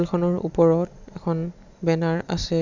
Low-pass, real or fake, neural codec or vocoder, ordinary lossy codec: 7.2 kHz; real; none; none